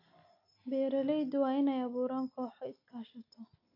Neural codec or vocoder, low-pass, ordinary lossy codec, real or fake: none; 5.4 kHz; AAC, 32 kbps; real